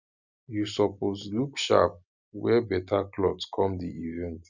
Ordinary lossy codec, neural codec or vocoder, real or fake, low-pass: none; none; real; 7.2 kHz